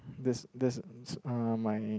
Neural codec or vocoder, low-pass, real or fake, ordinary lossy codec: none; none; real; none